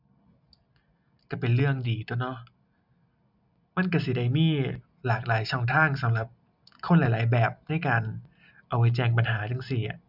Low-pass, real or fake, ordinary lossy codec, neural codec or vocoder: 5.4 kHz; real; none; none